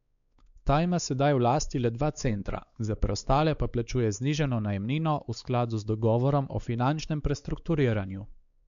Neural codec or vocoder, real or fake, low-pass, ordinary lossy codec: codec, 16 kHz, 4 kbps, X-Codec, WavLM features, trained on Multilingual LibriSpeech; fake; 7.2 kHz; none